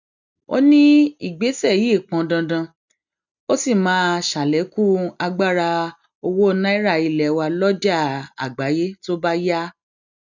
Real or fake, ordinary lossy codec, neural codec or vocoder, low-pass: real; none; none; 7.2 kHz